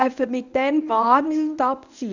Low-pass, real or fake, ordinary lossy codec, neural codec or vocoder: 7.2 kHz; fake; none; codec, 24 kHz, 0.9 kbps, WavTokenizer, medium speech release version 1